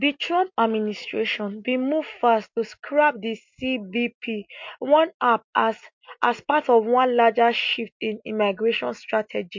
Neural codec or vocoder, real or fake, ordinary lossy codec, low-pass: none; real; MP3, 48 kbps; 7.2 kHz